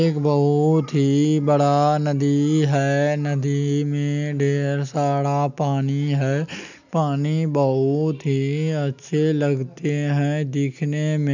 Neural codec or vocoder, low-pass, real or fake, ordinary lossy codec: none; 7.2 kHz; real; none